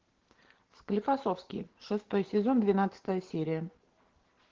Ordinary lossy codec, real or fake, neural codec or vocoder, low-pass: Opus, 16 kbps; real; none; 7.2 kHz